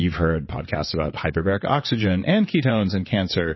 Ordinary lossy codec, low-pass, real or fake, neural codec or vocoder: MP3, 24 kbps; 7.2 kHz; fake; vocoder, 22.05 kHz, 80 mel bands, WaveNeXt